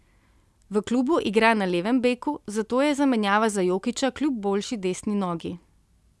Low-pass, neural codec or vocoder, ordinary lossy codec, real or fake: none; none; none; real